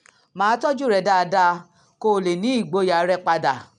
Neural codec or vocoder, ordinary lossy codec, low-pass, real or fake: none; none; 10.8 kHz; real